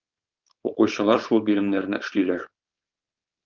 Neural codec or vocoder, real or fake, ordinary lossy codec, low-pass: codec, 16 kHz, 4.8 kbps, FACodec; fake; Opus, 32 kbps; 7.2 kHz